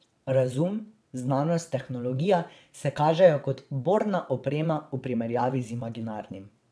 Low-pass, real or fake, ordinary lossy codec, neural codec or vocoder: none; fake; none; vocoder, 22.05 kHz, 80 mel bands, WaveNeXt